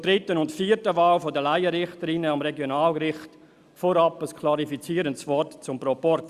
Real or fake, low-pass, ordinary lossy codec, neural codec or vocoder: real; 14.4 kHz; Opus, 64 kbps; none